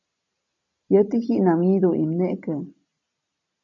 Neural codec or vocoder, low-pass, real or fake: none; 7.2 kHz; real